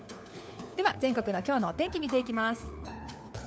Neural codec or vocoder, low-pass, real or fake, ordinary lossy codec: codec, 16 kHz, 4 kbps, FunCodec, trained on LibriTTS, 50 frames a second; none; fake; none